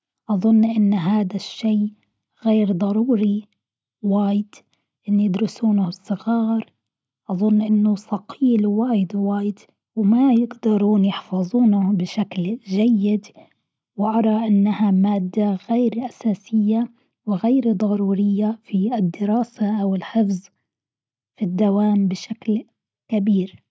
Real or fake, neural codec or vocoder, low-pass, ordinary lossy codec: real; none; none; none